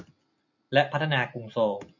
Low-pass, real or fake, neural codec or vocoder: 7.2 kHz; real; none